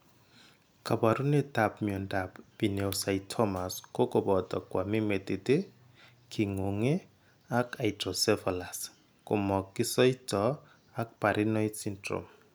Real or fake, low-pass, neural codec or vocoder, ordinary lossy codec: real; none; none; none